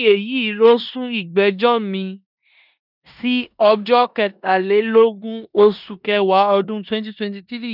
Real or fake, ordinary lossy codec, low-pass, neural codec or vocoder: fake; none; 5.4 kHz; codec, 16 kHz in and 24 kHz out, 0.9 kbps, LongCat-Audio-Codec, four codebook decoder